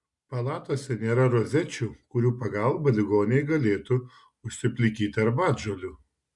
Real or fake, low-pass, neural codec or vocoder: real; 10.8 kHz; none